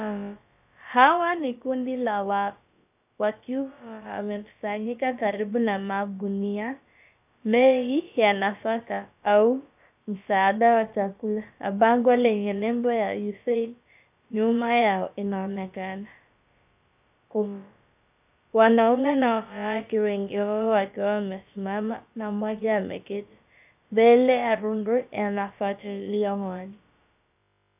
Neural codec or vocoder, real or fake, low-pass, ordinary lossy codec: codec, 16 kHz, about 1 kbps, DyCAST, with the encoder's durations; fake; 3.6 kHz; AAC, 32 kbps